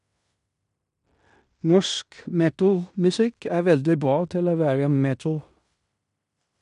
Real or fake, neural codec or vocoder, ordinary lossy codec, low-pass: fake; codec, 16 kHz in and 24 kHz out, 0.9 kbps, LongCat-Audio-Codec, fine tuned four codebook decoder; none; 10.8 kHz